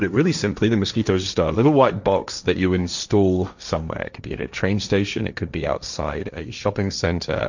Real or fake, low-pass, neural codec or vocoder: fake; 7.2 kHz; codec, 16 kHz, 1.1 kbps, Voila-Tokenizer